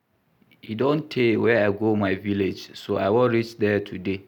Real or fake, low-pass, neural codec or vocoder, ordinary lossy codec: real; 19.8 kHz; none; none